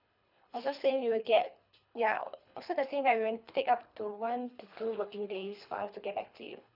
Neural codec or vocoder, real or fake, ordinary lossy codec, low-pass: codec, 24 kHz, 3 kbps, HILCodec; fake; none; 5.4 kHz